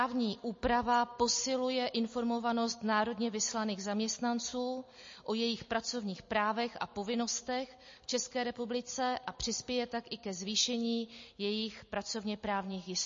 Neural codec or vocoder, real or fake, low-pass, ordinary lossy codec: none; real; 7.2 kHz; MP3, 32 kbps